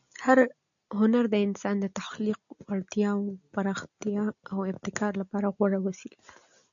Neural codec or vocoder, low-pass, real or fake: none; 7.2 kHz; real